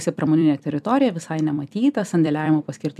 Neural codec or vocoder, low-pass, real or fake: vocoder, 44.1 kHz, 128 mel bands every 256 samples, BigVGAN v2; 14.4 kHz; fake